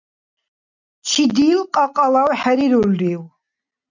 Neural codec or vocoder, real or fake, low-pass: none; real; 7.2 kHz